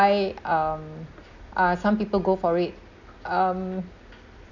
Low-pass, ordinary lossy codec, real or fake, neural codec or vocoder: 7.2 kHz; none; real; none